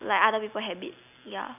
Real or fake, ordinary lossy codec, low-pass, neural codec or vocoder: real; none; 3.6 kHz; none